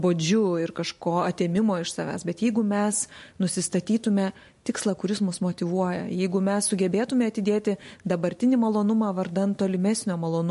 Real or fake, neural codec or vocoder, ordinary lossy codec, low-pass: real; none; MP3, 48 kbps; 14.4 kHz